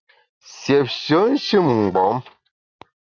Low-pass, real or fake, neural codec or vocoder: 7.2 kHz; real; none